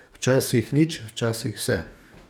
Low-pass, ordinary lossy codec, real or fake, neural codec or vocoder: 19.8 kHz; none; fake; codec, 44.1 kHz, 2.6 kbps, DAC